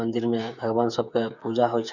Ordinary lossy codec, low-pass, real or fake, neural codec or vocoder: none; 7.2 kHz; fake; codec, 16 kHz, 8 kbps, FreqCodec, smaller model